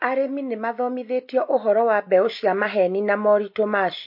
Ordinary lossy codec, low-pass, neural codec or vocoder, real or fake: MP3, 32 kbps; 5.4 kHz; none; real